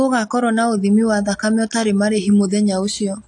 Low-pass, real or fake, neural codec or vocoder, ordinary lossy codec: 10.8 kHz; real; none; AAC, 64 kbps